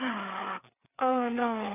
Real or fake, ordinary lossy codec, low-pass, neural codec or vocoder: fake; none; 3.6 kHz; codec, 16 kHz, 4 kbps, FreqCodec, larger model